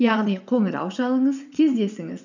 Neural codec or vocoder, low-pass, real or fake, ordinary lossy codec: vocoder, 22.05 kHz, 80 mel bands, WaveNeXt; 7.2 kHz; fake; none